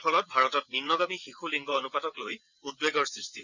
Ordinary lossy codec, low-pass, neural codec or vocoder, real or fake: none; 7.2 kHz; vocoder, 22.05 kHz, 80 mel bands, WaveNeXt; fake